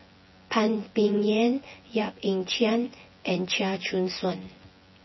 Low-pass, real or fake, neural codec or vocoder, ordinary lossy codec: 7.2 kHz; fake; vocoder, 24 kHz, 100 mel bands, Vocos; MP3, 24 kbps